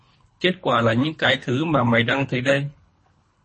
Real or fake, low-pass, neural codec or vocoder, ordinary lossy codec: fake; 10.8 kHz; codec, 24 kHz, 3 kbps, HILCodec; MP3, 32 kbps